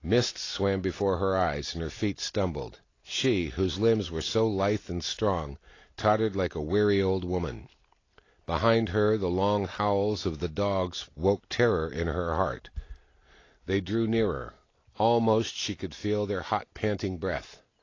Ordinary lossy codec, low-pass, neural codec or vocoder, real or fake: AAC, 32 kbps; 7.2 kHz; none; real